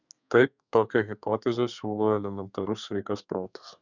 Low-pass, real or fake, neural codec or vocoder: 7.2 kHz; fake; codec, 32 kHz, 1.9 kbps, SNAC